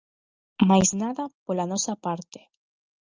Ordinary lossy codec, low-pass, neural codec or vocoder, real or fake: Opus, 24 kbps; 7.2 kHz; none; real